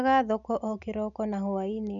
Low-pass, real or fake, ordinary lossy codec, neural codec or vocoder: 7.2 kHz; real; none; none